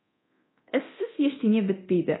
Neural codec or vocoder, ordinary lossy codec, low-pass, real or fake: codec, 24 kHz, 0.9 kbps, DualCodec; AAC, 16 kbps; 7.2 kHz; fake